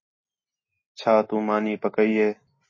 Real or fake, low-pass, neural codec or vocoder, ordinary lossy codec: real; 7.2 kHz; none; MP3, 32 kbps